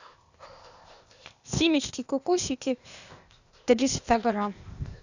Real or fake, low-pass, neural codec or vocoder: fake; 7.2 kHz; codec, 16 kHz, 0.8 kbps, ZipCodec